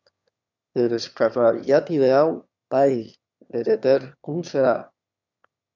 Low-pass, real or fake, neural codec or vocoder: 7.2 kHz; fake; autoencoder, 22.05 kHz, a latent of 192 numbers a frame, VITS, trained on one speaker